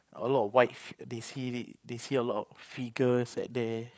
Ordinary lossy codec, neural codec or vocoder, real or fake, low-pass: none; codec, 16 kHz, 8 kbps, FreqCodec, larger model; fake; none